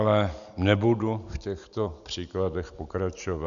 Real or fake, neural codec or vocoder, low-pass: real; none; 7.2 kHz